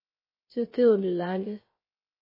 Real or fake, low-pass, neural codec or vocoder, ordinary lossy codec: fake; 5.4 kHz; codec, 16 kHz, 0.3 kbps, FocalCodec; MP3, 24 kbps